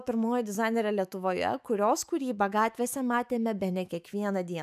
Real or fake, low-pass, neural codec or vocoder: fake; 14.4 kHz; autoencoder, 48 kHz, 128 numbers a frame, DAC-VAE, trained on Japanese speech